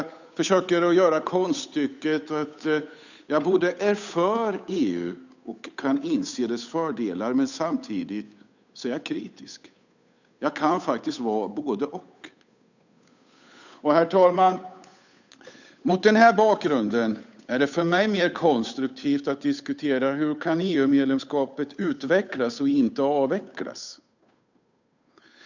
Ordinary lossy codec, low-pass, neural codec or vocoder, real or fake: none; 7.2 kHz; codec, 16 kHz, 8 kbps, FunCodec, trained on Chinese and English, 25 frames a second; fake